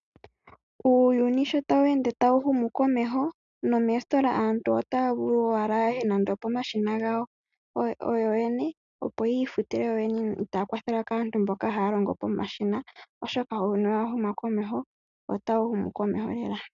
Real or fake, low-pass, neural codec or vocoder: real; 7.2 kHz; none